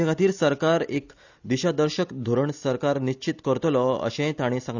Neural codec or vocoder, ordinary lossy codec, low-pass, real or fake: none; none; 7.2 kHz; real